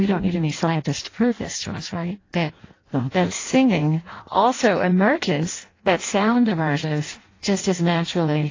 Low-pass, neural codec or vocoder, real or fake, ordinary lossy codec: 7.2 kHz; codec, 16 kHz in and 24 kHz out, 0.6 kbps, FireRedTTS-2 codec; fake; AAC, 32 kbps